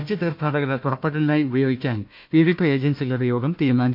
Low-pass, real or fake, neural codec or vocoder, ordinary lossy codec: 5.4 kHz; fake; codec, 16 kHz, 1 kbps, FunCodec, trained on Chinese and English, 50 frames a second; MP3, 48 kbps